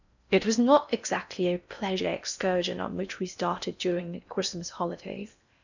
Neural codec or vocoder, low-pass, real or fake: codec, 16 kHz in and 24 kHz out, 0.6 kbps, FocalCodec, streaming, 4096 codes; 7.2 kHz; fake